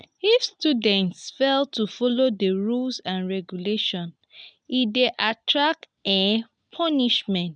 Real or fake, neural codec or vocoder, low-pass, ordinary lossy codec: real; none; 9.9 kHz; none